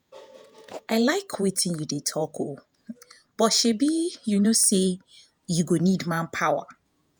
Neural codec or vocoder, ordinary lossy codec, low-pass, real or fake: vocoder, 48 kHz, 128 mel bands, Vocos; none; none; fake